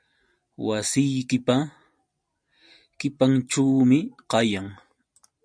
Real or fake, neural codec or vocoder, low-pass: real; none; 9.9 kHz